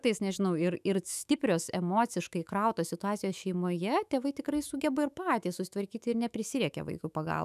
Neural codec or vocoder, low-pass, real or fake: autoencoder, 48 kHz, 128 numbers a frame, DAC-VAE, trained on Japanese speech; 14.4 kHz; fake